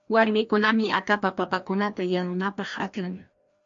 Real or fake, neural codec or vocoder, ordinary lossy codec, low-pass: fake; codec, 16 kHz, 1 kbps, FreqCodec, larger model; MP3, 48 kbps; 7.2 kHz